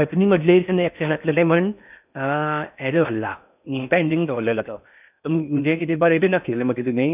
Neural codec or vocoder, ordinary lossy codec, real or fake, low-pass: codec, 16 kHz in and 24 kHz out, 0.6 kbps, FocalCodec, streaming, 4096 codes; none; fake; 3.6 kHz